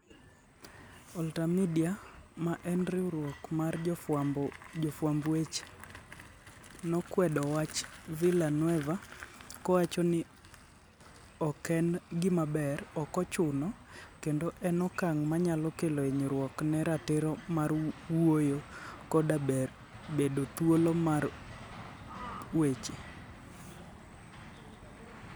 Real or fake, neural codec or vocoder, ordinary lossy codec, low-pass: real; none; none; none